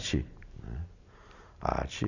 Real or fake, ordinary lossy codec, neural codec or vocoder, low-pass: real; none; none; 7.2 kHz